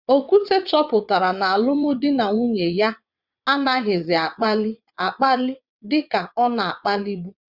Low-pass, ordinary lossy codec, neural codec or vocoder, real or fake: 5.4 kHz; Opus, 64 kbps; vocoder, 22.05 kHz, 80 mel bands, WaveNeXt; fake